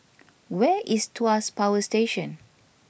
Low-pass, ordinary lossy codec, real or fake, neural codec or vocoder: none; none; real; none